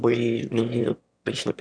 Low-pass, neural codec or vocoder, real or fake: 9.9 kHz; autoencoder, 22.05 kHz, a latent of 192 numbers a frame, VITS, trained on one speaker; fake